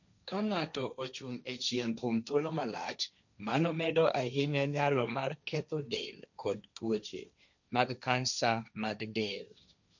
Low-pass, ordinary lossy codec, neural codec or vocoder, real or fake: 7.2 kHz; none; codec, 16 kHz, 1.1 kbps, Voila-Tokenizer; fake